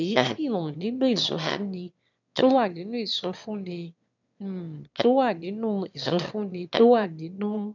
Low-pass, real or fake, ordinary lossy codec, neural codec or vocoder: 7.2 kHz; fake; none; autoencoder, 22.05 kHz, a latent of 192 numbers a frame, VITS, trained on one speaker